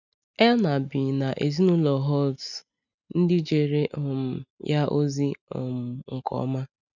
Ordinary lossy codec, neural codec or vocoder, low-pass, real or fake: none; none; 7.2 kHz; real